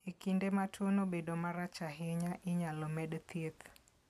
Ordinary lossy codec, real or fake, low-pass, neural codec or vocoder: none; real; 14.4 kHz; none